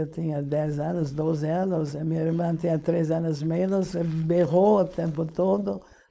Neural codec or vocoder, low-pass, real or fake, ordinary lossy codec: codec, 16 kHz, 4.8 kbps, FACodec; none; fake; none